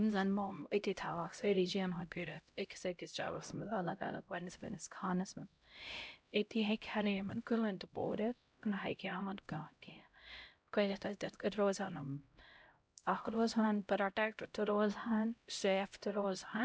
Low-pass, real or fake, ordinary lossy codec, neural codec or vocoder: none; fake; none; codec, 16 kHz, 0.5 kbps, X-Codec, HuBERT features, trained on LibriSpeech